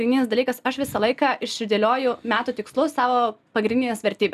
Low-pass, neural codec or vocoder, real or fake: 14.4 kHz; none; real